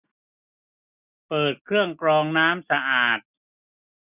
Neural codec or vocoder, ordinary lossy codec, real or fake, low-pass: none; none; real; 3.6 kHz